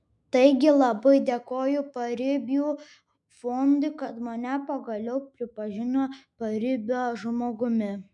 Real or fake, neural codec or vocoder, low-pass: fake; autoencoder, 48 kHz, 128 numbers a frame, DAC-VAE, trained on Japanese speech; 10.8 kHz